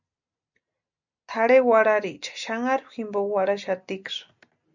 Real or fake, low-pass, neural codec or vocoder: real; 7.2 kHz; none